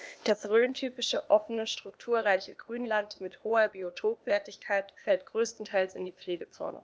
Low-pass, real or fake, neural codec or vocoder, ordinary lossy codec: none; fake; codec, 16 kHz, 0.8 kbps, ZipCodec; none